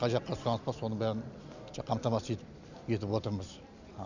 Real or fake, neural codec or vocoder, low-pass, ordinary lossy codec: real; none; 7.2 kHz; none